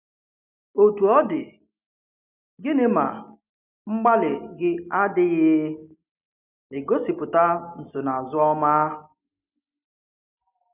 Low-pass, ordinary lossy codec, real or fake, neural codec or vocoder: 3.6 kHz; none; real; none